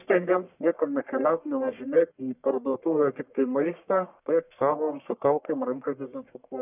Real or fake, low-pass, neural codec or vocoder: fake; 3.6 kHz; codec, 44.1 kHz, 1.7 kbps, Pupu-Codec